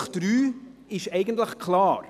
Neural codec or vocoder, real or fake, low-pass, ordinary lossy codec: none; real; 14.4 kHz; none